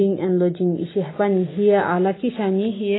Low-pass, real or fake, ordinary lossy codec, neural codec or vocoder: 7.2 kHz; real; AAC, 16 kbps; none